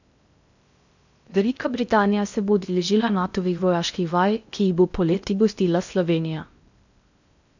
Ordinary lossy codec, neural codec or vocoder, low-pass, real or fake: none; codec, 16 kHz in and 24 kHz out, 0.6 kbps, FocalCodec, streaming, 2048 codes; 7.2 kHz; fake